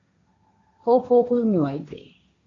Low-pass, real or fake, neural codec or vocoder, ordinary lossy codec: 7.2 kHz; fake; codec, 16 kHz, 1.1 kbps, Voila-Tokenizer; AAC, 32 kbps